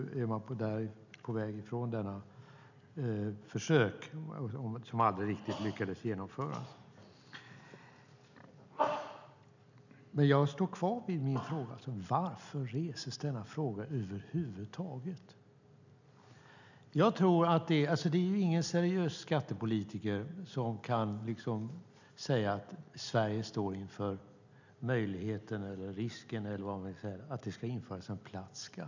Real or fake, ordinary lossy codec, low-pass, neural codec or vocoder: real; none; 7.2 kHz; none